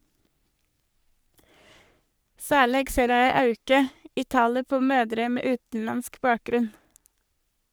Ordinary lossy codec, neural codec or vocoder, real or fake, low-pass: none; codec, 44.1 kHz, 3.4 kbps, Pupu-Codec; fake; none